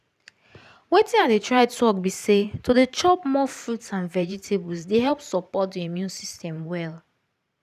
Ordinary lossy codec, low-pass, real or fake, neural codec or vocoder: none; 14.4 kHz; fake; vocoder, 44.1 kHz, 128 mel bands every 512 samples, BigVGAN v2